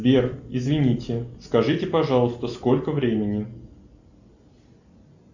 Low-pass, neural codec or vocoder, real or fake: 7.2 kHz; none; real